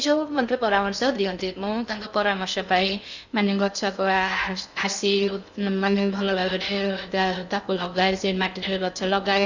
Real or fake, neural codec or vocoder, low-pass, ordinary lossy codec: fake; codec, 16 kHz in and 24 kHz out, 0.6 kbps, FocalCodec, streaming, 2048 codes; 7.2 kHz; none